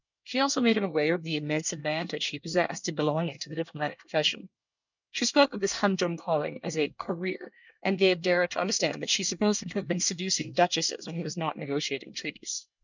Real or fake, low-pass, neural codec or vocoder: fake; 7.2 kHz; codec, 24 kHz, 1 kbps, SNAC